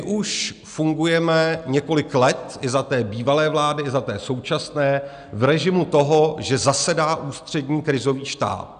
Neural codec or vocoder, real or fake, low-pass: none; real; 9.9 kHz